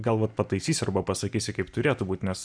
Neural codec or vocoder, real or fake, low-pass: none; real; 9.9 kHz